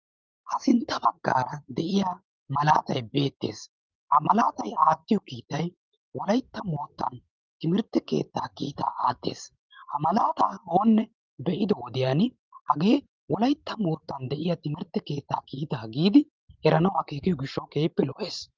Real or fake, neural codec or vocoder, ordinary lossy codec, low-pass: fake; vocoder, 44.1 kHz, 128 mel bands every 512 samples, BigVGAN v2; Opus, 32 kbps; 7.2 kHz